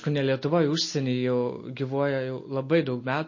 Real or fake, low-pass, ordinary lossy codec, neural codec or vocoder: real; 7.2 kHz; MP3, 32 kbps; none